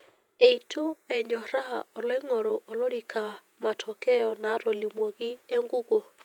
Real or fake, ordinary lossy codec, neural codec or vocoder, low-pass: fake; none; vocoder, 48 kHz, 128 mel bands, Vocos; 19.8 kHz